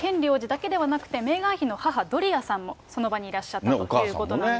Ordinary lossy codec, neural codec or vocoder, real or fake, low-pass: none; none; real; none